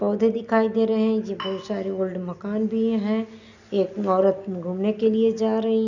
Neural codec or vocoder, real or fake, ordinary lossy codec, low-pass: none; real; none; 7.2 kHz